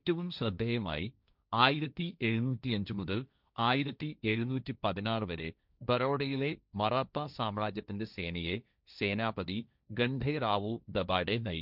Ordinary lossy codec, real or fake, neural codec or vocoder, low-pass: none; fake; codec, 16 kHz, 1.1 kbps, Voila-Tokenizer; 5.4 kHz